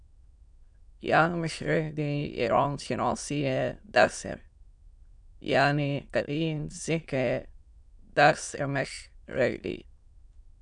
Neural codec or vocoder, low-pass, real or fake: autoencoder, 22.05 kHz, a latent of 192 numbers a frame, VITS, trained on many speakers; 9.9 kHz; fake